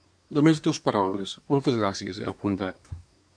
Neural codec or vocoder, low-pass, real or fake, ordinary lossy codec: codec, 24 kHz, 1 kbps, SNAC; 9.9 kHz; fake; MP3, 96 kbps